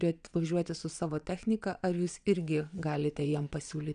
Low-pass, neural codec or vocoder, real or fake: 9.9 kHz; vocoder, 22.05 kHz, 80 mel bands, WaveNeXt; fake